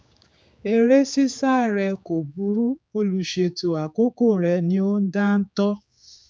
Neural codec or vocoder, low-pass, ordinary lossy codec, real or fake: codec, 16 kHz, 4 kbps, X-Codec, HuBERT features, trained on general audio; none; none; fake